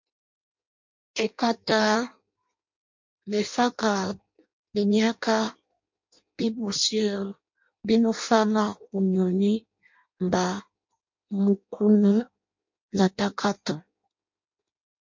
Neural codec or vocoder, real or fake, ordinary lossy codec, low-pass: codec, 16 kHz in and 24 kHz out, 0.6 kbps, FireRedTTS-2 codec; fake; MP3, 48 kbps; 7.2 kHz